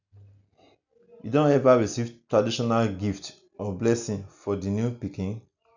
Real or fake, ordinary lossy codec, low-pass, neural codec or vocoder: real; none; 7.2 kHz; none